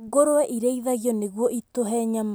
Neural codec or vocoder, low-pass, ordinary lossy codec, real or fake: none; none; none; real